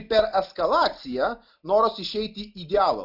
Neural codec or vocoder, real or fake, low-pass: none; real; 5.4 kHz